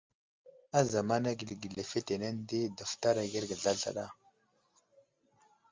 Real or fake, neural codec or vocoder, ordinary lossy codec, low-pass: real; none; Opus, 32 kbps; 7.2 kHz